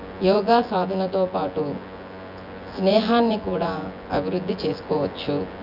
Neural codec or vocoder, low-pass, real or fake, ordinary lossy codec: vocoder, 24 kHz, 100 mel bands, Vocos; 5.4 kHz; fake; none